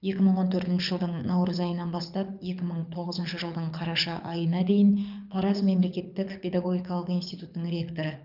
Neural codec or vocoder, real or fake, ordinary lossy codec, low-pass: codec, 24 kHz, 6 kbps, HILCodec; fake; none; 5.4 kHz